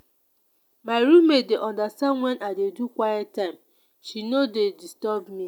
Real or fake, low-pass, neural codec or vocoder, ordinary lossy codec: real; 19.8 kHz; none; none